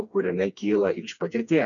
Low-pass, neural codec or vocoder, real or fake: 7.2 kHz; codec, 16 kHz, 2 kbps, FreqCodec, smaller model; fake